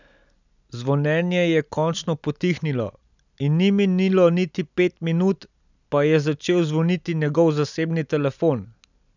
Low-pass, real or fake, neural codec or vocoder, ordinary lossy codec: 7.2 kHz; real; none; none